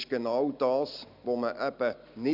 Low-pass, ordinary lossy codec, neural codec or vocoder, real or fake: 5.4 kHz; none; none; real